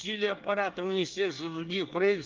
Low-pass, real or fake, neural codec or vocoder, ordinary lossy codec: 7.2 kHz; fake; codec, 24 kHz, 1 kbps, SNAC; Opus, 32 kbps